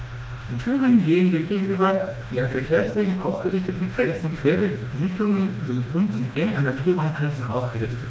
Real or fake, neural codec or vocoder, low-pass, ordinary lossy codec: fake; codec, 16 kHz, 1 kbps, FreqCodec, smaller model; none; none